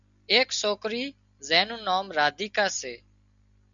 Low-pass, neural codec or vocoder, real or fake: 7.2 kHz; none; real